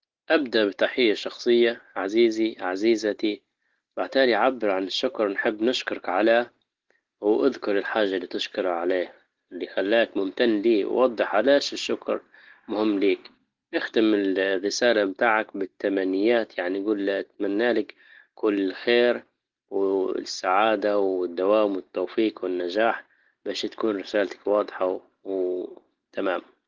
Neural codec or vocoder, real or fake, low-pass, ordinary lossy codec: none; real; 7.2 kHz; Opus, 16 kbps